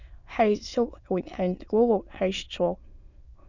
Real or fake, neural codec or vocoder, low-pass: fake; autoencoder, 22.05 kHz, a latent of 192 numbers a frame, VITS, trained on many speakers; 7.2 kHz